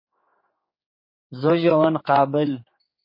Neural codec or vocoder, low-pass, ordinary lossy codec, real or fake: codec, 16 kHz, 4 kbps, X-Codec, HuBERT features, trained on general audio; 5.4 kHz; MP3, 24 kbps; fake